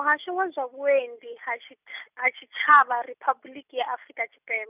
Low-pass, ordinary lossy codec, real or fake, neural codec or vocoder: 3.6 kHz; none; real; none